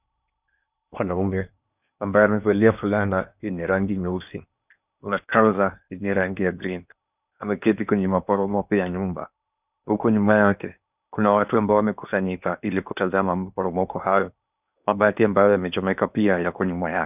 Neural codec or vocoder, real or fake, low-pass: codec, 16 kHz in and 24 kHz out, 0.8 kbps, FocalCodec, streaming, 65536 codes; fake; 3.6 kHz